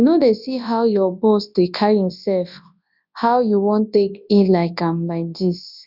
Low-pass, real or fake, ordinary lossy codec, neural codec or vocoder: 5.4 kHz; fake; none; codec, 24 kHz, 0.9 kbps, WavTokenizer, large speech release